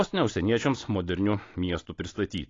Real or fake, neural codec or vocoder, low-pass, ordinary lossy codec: real; none; 7.2 kHz; AAC, 32 kbps